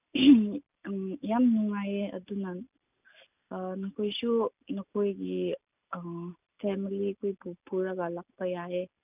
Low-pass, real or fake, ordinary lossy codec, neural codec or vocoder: 3.6 kHz; real; none; none